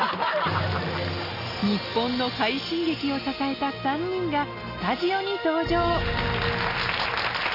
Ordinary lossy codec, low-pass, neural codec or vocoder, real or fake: none; 5.4 kHz; none; real